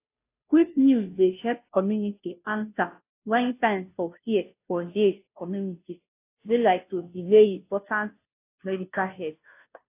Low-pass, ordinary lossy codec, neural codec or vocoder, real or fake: 3.6 kHz; AAC, 24 kbps; codec, 16 kHz, 0.5 kbps, FunCodec, trained on Chinese and English, 25 frames a second; fake